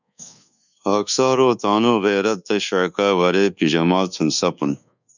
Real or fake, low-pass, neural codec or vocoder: fake; 7.2 kHz; codec, 24 kHz, 1.2 kbps, DualCodec